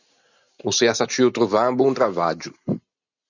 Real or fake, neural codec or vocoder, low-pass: real; none; 7.2 kHz